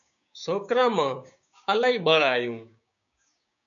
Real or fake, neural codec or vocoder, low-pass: fake; codec, 16 kHz, 6 kbps, DAC; 7.2 kHz